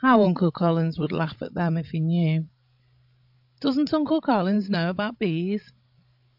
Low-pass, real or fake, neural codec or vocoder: 5.4 kHz; fake; codec, 16 kHz, 16 kbps, FreqCodec, larger model